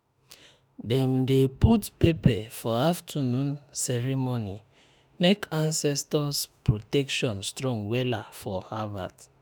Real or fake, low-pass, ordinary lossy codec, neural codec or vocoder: fake; none; none; autoencoder, 48 kHz, 32 numbers a frame, DAC-VAE, trained on Japanese speech